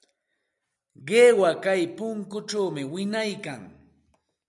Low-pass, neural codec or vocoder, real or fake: 10.8 kHz; none; real